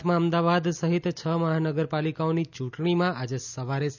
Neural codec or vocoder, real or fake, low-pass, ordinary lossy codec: none; real; 7.2 kHz; none